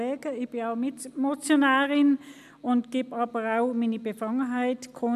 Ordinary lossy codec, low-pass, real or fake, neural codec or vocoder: none; 14.4 kHz; real; none